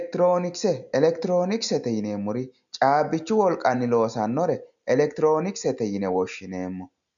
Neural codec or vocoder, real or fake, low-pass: none; real; 7.2 kHz